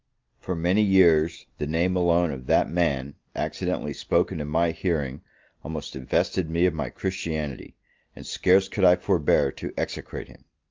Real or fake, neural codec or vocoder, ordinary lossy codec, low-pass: real; none; Opus, 32 kbps; 7.2 kHz